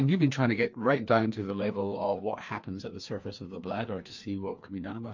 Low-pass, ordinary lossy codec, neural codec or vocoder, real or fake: 7.2 kHz; MP3, 48 kbps; codec, 16 kHz, 2 kbps, FreqCodec, larger model; fake